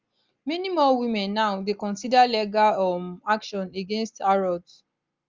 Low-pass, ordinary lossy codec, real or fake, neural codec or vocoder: 7.2 kHz; Opus, 32 kbps; real; none